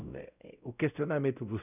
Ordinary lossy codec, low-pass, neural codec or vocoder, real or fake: none; 3.6 kHz; codec, 16 kHz, 0.5 kbps, X-Codec, WavLM features, trained on Multilingual LibriSpeech; fake